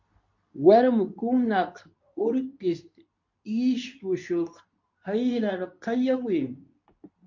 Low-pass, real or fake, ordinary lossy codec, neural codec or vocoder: 7.2 kHz; fake; MP3, 48 kbps; codec, 24 kHz, 0.9 kbps, WavTokenizer, medium speech release version 2